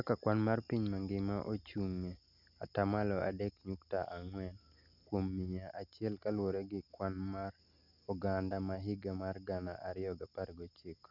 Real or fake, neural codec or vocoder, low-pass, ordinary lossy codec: real; none; 5.4 kHz; none